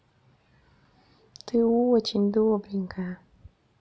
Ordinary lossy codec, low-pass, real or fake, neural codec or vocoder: none; none; real; none